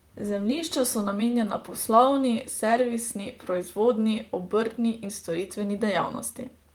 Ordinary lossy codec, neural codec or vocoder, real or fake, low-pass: Opus, 32 kbps; vocoder, 44.1 kHz, 128 mel bands, Pupu-Vocoder; fake; 19.8 kHz